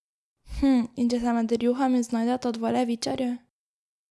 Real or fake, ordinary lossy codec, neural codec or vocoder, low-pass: real; none; none; none